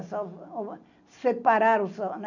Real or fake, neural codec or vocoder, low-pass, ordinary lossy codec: real; none; 7.2 kHz; none